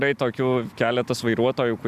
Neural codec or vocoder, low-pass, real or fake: none; 14.4 kHz; real